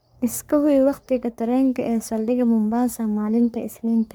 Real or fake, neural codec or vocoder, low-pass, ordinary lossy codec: fake; codec, 44.1 kHz, 3.4 kbps, Pupu-Codec; none; none